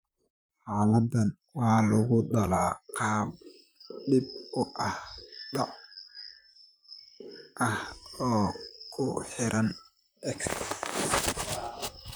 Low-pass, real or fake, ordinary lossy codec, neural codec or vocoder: none; fake; none; vocoder, 44.1 kHz, 128 mel bands, Pupu-Vocoder